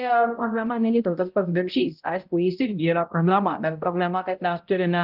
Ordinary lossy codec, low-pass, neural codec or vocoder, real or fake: Opus, 32 kbps; 5.4 kHz; codec, 16 kHz, 0.5 kbps, X-Codec, HuBERT features, trained on balanced general audio; fake